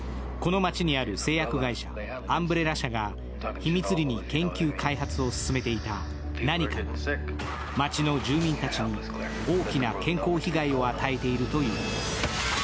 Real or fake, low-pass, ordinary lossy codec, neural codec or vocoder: real; none; none; none